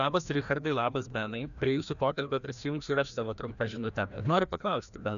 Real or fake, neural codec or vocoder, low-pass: fake; codec, 16 kHz, 1 kbps, FreqCodec, larger model; 7.2 kHz